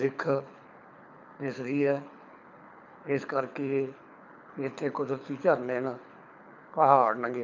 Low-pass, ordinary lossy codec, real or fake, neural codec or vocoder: 7.2 kHz; none; fake; codec, 24 kHz, 6 kbps, HILCodec